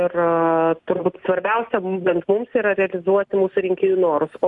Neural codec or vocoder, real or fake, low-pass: none; real; 10.8 kHz